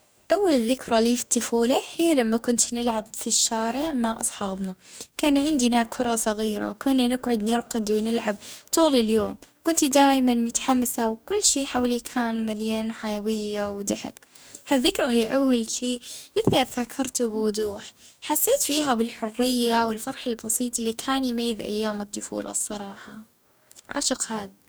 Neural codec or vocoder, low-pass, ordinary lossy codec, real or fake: codec, 44.1 kHz, 2.6 kbps, DAC; none; none; fake